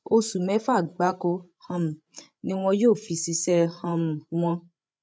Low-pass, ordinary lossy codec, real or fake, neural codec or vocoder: none; none; fake; codec, 16 kHz, 8 kbps, FreqCodec, larger model